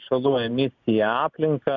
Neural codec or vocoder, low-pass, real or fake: none; 7.2 kHz; real